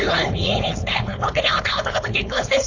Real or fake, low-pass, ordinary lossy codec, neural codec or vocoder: fake; 7.2 kHz; AAC, 48 kbps; codec, 16 kHz, 4.8 kbps, FACodec